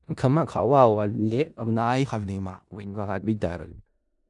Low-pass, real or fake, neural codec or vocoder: 10.8 kHz; fake; codec, 16 kHz in and 24 kHz out, 0.4 kbps, LongCat-Audio-Codec, four codebook decoder